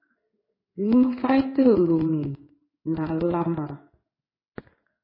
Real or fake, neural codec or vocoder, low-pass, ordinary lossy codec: fake; codec, 16 kHz in and 24 kHz out, 1 kbps, XY-Tokenizer; 5.4 kHz; MP3, 24 kbps